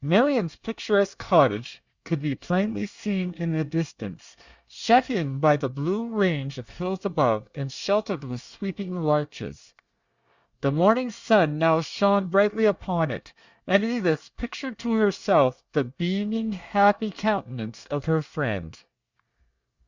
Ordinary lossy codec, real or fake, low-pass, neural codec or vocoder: Opus, 64 kbps; fake; 7.2 kHz; codec, 24 kHz, 1 kbps, SNAC